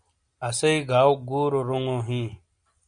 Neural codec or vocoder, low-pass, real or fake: none; 9.9 kHz; real